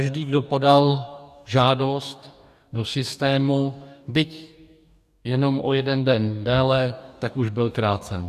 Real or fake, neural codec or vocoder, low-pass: fake; codec, 44.1 kHz, 2.6 kbps, DAC; 14.4 kHz